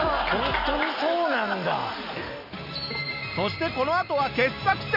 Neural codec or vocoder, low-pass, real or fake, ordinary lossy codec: none; 5.4 kHz; real; none